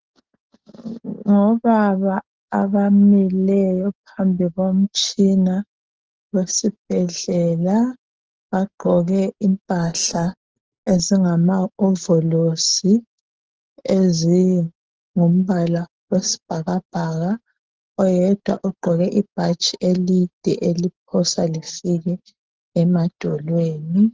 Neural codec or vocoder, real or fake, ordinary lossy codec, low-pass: none; real; Opus, 16 kbps; 7.2 kHz